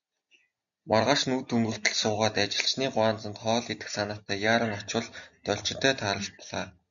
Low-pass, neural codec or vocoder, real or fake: 7.2 kHz; none; real